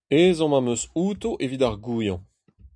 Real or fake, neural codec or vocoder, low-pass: real; none; 9.9 kHz